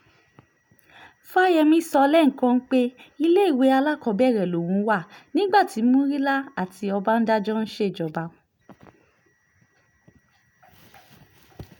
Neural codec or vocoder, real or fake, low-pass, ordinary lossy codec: none; real; 19.8 kHz; none